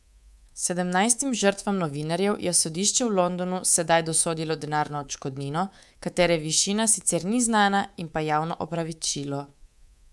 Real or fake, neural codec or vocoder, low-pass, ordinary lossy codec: fake; codec, 24 kHz, 3.1 kbps, DualCodec; none; none